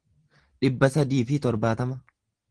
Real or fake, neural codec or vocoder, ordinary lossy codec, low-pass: real; none; Opus, 16 kbps; 9.9 kHz